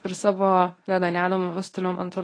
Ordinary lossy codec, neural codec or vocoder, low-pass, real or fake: AAC, 32 kbps; codec, 24 kHz, 0.9 kbps, WavTokenizer, medium speech release version 1; 9.9 kHz; fake